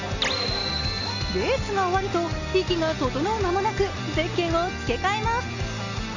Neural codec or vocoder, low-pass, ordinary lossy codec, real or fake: none; 7.2 kHz; none; real